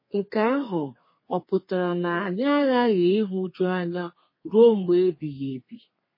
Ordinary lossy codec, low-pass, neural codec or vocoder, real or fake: MP3, 24 kbps; 5.4 kHz; codec, 32 kHz, 1.9 kbps, SNAC; fake